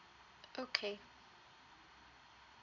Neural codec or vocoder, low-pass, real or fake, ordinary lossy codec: none; 7.2 kHz; real; none